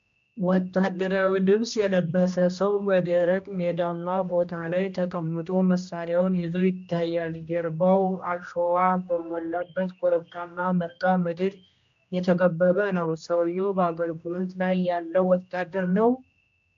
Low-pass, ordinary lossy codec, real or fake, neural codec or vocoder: 7.2 kHz; MP3, 64 kbps; fake; codec, 16 kHz, 1 kbps, X-Codec, HuBERT features, trained on general audio